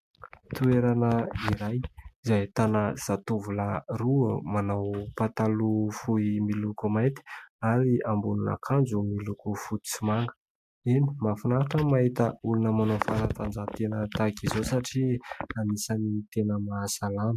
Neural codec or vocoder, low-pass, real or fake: none; 14.4 kHz; real